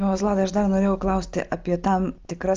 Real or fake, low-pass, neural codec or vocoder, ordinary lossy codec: real; 7.2 kHz; none; Opus, 24 kbps